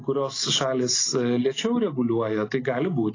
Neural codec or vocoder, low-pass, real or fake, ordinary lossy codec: none; 7.2 kHz; real; AAC, 32 kbps